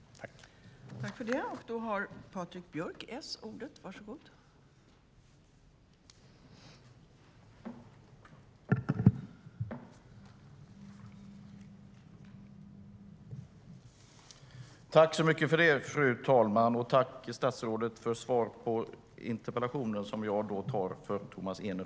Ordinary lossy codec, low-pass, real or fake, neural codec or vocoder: none; none; real; none